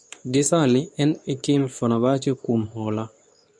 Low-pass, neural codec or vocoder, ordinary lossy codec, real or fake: none; codec, 24 kHz, 0.9 kbps, WavTokenizer, medium speech release version 2; none; fake